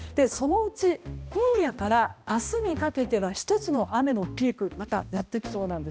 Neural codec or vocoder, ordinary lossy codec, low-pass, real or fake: codec, 16 kHz, 1 kbps, X-Codec, HuBERT features, trained on balanced general audio; none; none; fake